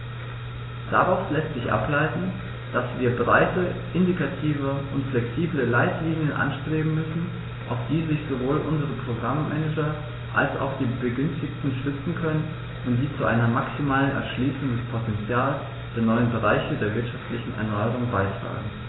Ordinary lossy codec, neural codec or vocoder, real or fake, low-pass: AAC, 16 kbps; none; real; 7.2 kHz